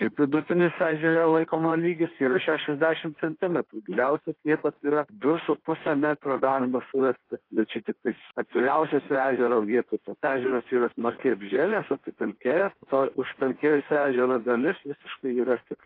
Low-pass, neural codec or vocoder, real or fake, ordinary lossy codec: 5.4 kHz; codec, 16 kHz in and 24 kHz out, 1.1 kbps, FireRedTTS-2 codec; fake; AAC, 32 kbps